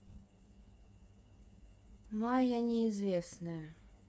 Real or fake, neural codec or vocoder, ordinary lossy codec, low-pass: fake; codec, 16 kHz, 4 kbps, FreqCodec, smaller model; none; none